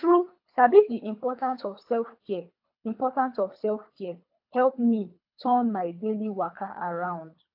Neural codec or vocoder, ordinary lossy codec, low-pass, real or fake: codec, 24 kHz, 3 kbps, HILCodec; AAC, 48 kbps; 5.4 kHz; fake